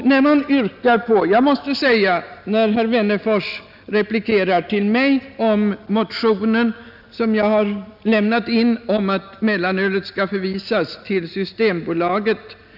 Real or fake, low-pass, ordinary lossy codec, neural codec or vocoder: real; 5.4 kHz; none; none